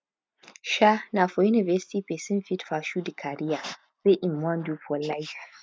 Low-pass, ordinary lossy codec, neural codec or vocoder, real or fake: none; none; none; real